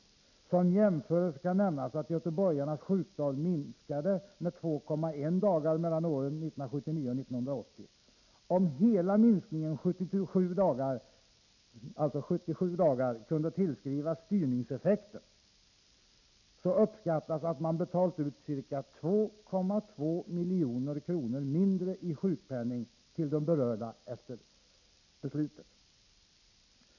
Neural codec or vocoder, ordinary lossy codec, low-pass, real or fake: none; none; 7.2 kHz; real